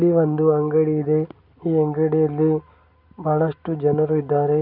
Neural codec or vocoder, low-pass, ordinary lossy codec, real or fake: none; 5.4 kHz; none; real